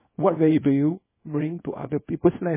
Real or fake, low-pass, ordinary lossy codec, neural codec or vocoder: fake; 3.6 kHz; MP3, 16 kbps; codec, 24 kHz, 0.9 kbps, WavTokenizer, small release